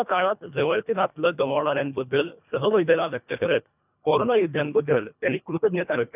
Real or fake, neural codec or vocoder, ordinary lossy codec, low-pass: fake; codec, 24 kHz, 1.5 kbps, HILCodec; none; 3.6 kHz